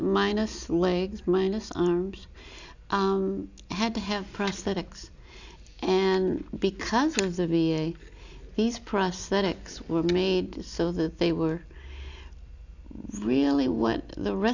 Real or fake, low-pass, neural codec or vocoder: real; 7.2 kHz; none